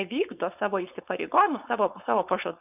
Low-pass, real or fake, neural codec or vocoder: 3.6 kHz; fake; codec, 16 kHz, 4.8 kbps, FACodec